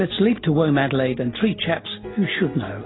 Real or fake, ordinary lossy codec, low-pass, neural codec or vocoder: real; AAC, 16 kbps; 7.2 kHz; none